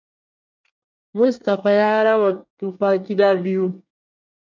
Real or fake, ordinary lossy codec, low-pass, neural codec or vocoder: fake; MP3, 64 kbps; 7.2 kHz; codec, 24 kHz, 1 kbps, SNAC